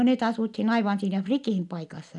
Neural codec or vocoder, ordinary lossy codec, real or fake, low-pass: none; none; real; 10.8 kHz